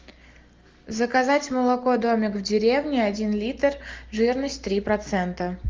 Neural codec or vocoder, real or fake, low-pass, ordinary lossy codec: none; real; 7.2 kHz; Opus, 32 kbps